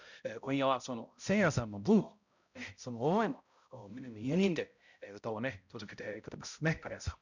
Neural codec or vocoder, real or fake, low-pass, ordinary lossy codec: codec, 16 kHz, 0.5 kbps, X-Codec, HuBERT features, trained on balanced general audio; fake; 7.2 kHz; none